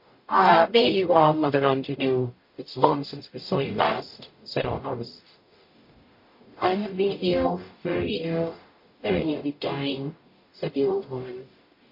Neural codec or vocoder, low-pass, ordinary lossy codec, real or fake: codec, 44.1 kHz, 0.9 kbps, DAC; 5.4 kHz; MP3, 32 kbps; fake